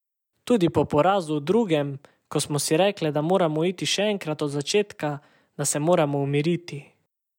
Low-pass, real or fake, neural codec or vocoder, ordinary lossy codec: 19.8 kHz; real; none; none